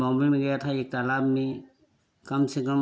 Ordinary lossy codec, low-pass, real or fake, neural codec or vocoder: none; none; real; none